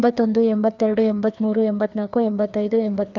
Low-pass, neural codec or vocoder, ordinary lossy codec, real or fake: 7.2 kHz; codec, 16 kHz, 2 kbps, FreqCodec, larger model; none; fake